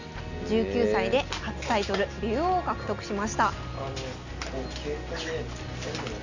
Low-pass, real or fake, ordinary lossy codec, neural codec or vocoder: 7.2 kHz; real; none; none